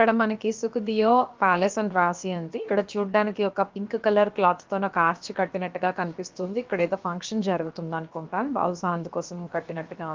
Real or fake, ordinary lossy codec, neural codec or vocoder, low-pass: fake; Opus, 24 kbps; codec, 16 kHz, about 1 kbps, DyCAST, with the encoder's durations; 7.2 kHz